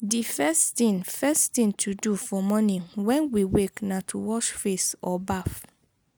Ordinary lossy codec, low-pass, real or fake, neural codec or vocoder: none; none; real; none